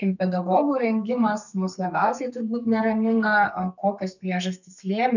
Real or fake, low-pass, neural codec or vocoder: fake; 7.2 kHz; codec, 32 kHz, 1.9 kbps, SNAC